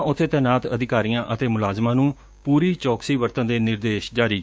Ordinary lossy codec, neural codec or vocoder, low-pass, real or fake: none; codec, 16 kHz, 6 kbps, DAC; none; fake